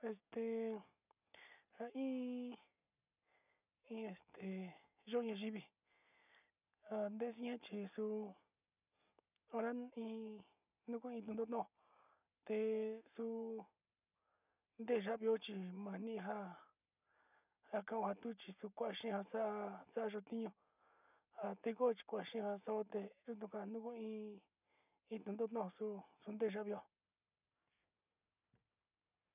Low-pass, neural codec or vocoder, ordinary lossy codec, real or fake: 3.6 kHz; none; none; real